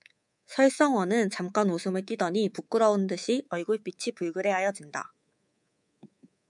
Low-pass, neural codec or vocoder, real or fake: 10.8 kHz; codec, 24 kHz, 3.1 kbps, DualCodec; fake